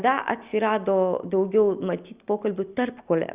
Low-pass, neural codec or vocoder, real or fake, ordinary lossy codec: 3.6 kHz; none; real; Opus, 64 kbps